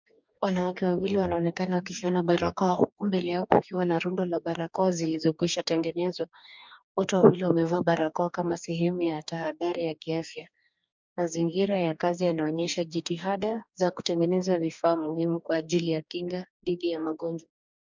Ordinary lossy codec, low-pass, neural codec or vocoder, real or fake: MP3, 64 kbps; 7.2 kHz; codec, 44.1 kHz, 2.6 kbps, DAC; fake